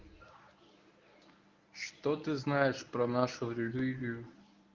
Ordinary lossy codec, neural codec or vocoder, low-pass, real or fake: Opus, 24 kbps; codec, 24 kHz, 0.9 kbps, WavTokenizer, medium speech release version 1; 7.2 kHz; fake